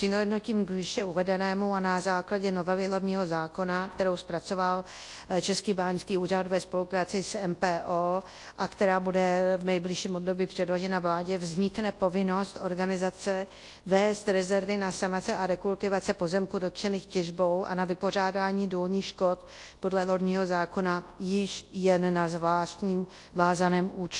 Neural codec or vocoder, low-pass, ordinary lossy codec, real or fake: codec, 24 kHz, 0.9 kbps, WavTokenizer, large speech release; 10.8 kHz; AAC, 48 kbps; fake